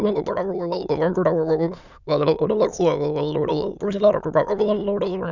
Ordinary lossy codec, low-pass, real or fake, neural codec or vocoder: none; 7.2 kHz; fake; autoencoder, 22.05 kHz, a latent of 192 numbers a frame, VITS, trained on many speakers